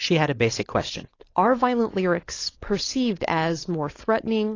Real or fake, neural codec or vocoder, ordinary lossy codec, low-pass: fake; codec, 16 kHz, 4.8 kbps, FACodec; AAC, 32 kbps; 7.2 kHz